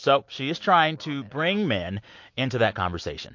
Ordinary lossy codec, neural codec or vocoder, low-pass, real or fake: MP3, 48 kbps; none; 7.2 kHz; real